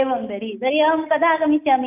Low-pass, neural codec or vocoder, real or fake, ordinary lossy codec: 3.6 kHz; none; real; none